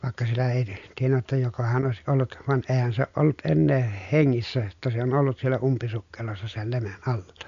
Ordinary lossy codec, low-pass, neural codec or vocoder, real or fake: AAC, 96 kbps; 7.2 kHz; none; real